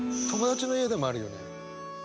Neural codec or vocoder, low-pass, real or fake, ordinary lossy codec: none; none; real; none